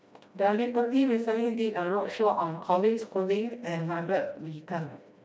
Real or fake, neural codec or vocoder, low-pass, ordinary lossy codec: fake; codec, 16 kHz, 1 kbps, FreqCodec, smaller model; none; none